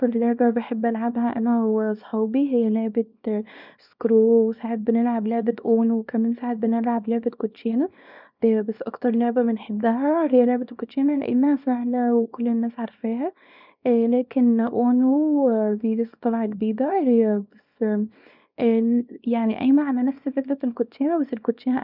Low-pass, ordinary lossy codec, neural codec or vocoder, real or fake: 5.4 kHz; none; codec, 24 kHz, 0.9 kbps, WavTokenizer, small release; fake